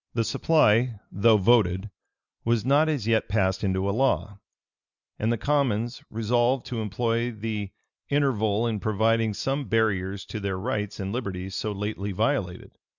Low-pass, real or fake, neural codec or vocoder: 7.2 kHz; real; none